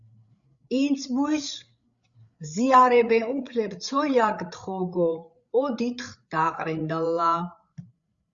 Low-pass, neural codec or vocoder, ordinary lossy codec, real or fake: 7.2 kHz; codec, 16 kHz, 8 kbps, FreqCodec, larger model; Opus, 64 kbps; fake